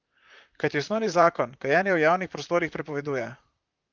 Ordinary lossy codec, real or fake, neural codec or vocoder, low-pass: Opus, 32 kbps; fake; vocoder, 44.1 kHz, 128 mel bands, Pupu-Vocoder; 7.2 kHz